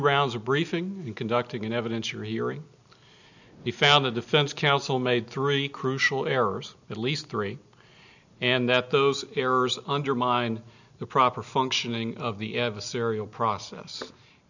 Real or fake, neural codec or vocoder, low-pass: real; none; 7.2 kHz